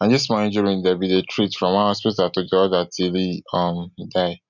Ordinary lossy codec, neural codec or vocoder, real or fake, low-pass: none; none; real; 7.2 kHz